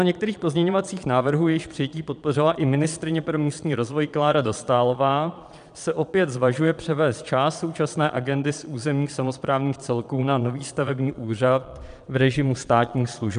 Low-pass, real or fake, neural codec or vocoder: 9.9 kHz; fake; vocoder, 22.05 kHz, 80 mel bands, Vocos